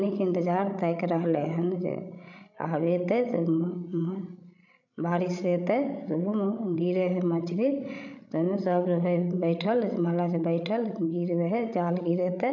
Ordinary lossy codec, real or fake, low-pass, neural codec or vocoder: none; fake; 7.2 kHz; codec, 16 kHz, 16 kbps, FreqCodec, larger model